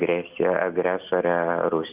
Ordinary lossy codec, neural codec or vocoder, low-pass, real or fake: Opus, 32 kbps; none; 3.6 kHz; real